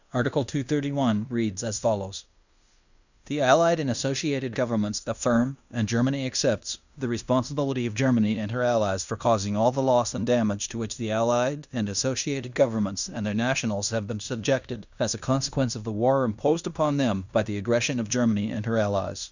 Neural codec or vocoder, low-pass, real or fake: codec, 16 kHz in and 24 kHz out, 0.9 kbps, LongCat-Audio-Codec, fine tuned four codebook decoder; 7.2 kHz; fake